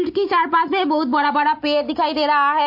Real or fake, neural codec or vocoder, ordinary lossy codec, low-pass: real; none; MP3, 32 kbps; 5.4 kHz